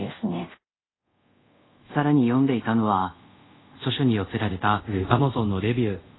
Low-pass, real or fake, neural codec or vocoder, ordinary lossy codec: 7.2 kHz; fake; codec, 24 kHz, 0.5 kbps, DualCodec; AAC, 16 kbps